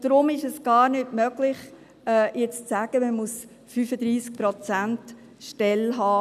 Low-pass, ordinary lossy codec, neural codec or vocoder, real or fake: 14.4 kHz; none; none; real